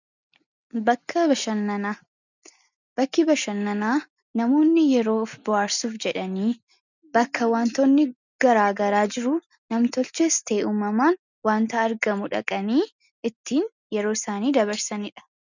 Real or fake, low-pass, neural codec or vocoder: real; 7.2 kHz; none